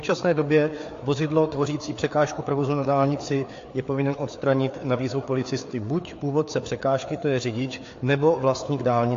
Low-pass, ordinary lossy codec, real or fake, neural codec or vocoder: 7.2 kHz; AAC, 48 kbps; fake; codec, 16 kHz, 4 kbps, FreqCodec, larger model